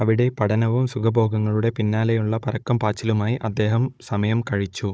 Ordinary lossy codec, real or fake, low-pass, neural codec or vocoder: none; fake; none; codec, 16 kHz, 16 kbps, FunCodec, trained on Chinese and English, 50 frames a second